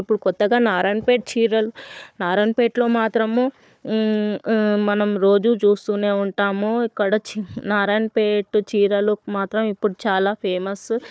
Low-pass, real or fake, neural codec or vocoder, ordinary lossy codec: none; fake; codec, 16 kHz, 4 kbps, FunCodec, trained on Chinese and English, 50 frames a second; none